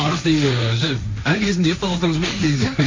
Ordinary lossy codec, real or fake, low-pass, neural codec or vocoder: MP3, 48 kbps; fake; 7.2 kHz; codec, 16 kHz, 1.1 kbps, Voila-Tokenizer